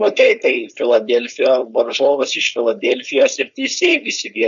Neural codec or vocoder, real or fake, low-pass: codec, 16 kHz, 4.8 kbps, FACodec; fake; 7.2 kHz